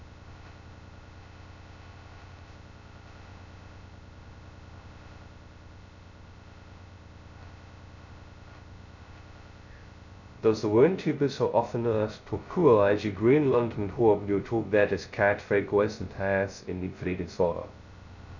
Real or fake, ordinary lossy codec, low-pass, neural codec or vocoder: fake; none; 7.2 kHz; codec, 16 kHz, 0.2 kbps, FocalCodec